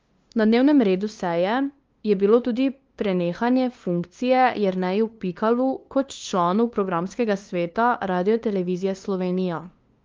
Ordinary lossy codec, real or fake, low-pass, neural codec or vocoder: Opus, 32 kbps; fake; 7.2 kHz; codec, 16 kHz, 2 kbps, FunCodec, trained on LibriTTS, 25 frames a second